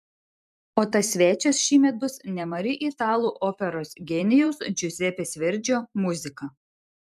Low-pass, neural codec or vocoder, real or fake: 14.4 kHz; vocoder, 44.1 kHz, 128 mel bands every 256 samples, BigVGAN v2; fake